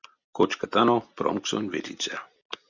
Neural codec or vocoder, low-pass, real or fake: none; 7.2 kHz; real